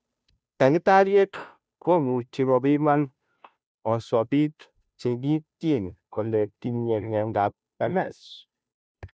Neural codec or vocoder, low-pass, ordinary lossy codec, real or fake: codec, 16 kHz, 0.5 kbps, FunCodec, trained on Chinese and English, 25 frames a second; none; none; fake